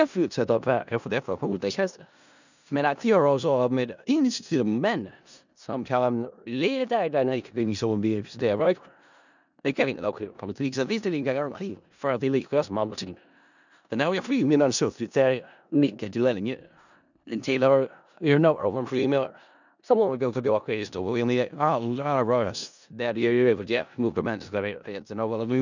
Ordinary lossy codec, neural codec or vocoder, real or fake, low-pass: none; codec, 16 kHz in and 24 kHz out, 0.4 kbps, LongCat-Audio-Codec, four codebook decoder; fake; 7.2 kHz